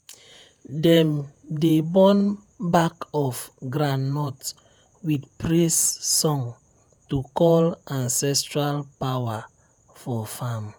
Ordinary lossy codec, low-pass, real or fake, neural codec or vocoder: none; none; fake; vocoder, 48 kHz, 128 mel bands, Vocos